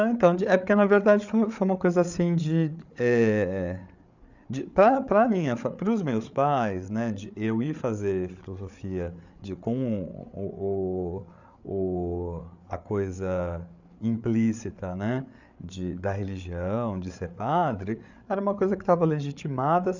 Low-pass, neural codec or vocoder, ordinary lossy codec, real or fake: 7.2 kHz; codec, 16 kHz, 8 kbps, FreqCodec, larger model; none; fake